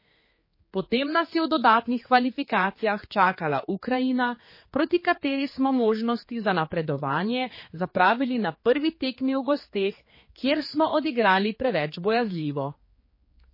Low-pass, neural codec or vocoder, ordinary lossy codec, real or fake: 5.4 kHz; codec, 16 kHz, 4 kbps, X-Codec, HuBERT features, trained on general audio; MP3, 24 kbps; fake